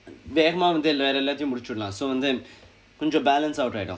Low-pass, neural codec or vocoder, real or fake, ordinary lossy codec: none; none; real; none